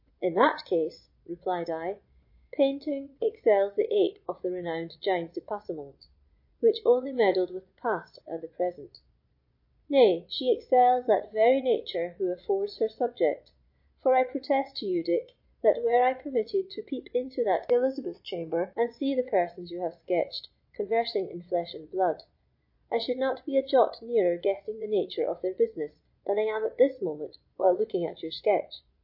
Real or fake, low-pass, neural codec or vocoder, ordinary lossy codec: fake; 5.4 kHz; vocoder, 22.05 kHz, 80 mel bands, Vocos; MP3, 32 kbps